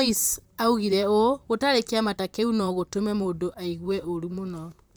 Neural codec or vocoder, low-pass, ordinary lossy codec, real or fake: vocoder, 44.1 kHz, 128 mel bands, Pupu-Vocoder; none; none; fake